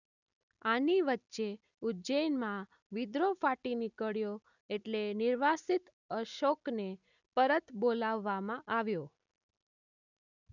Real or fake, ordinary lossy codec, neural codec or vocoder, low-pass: real; none; none; 7.2 kHz